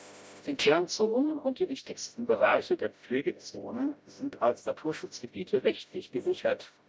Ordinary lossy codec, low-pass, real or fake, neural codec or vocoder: none; none; fake; codec, 16 kHz, 0.5 kbps, FreqCodec, smaller model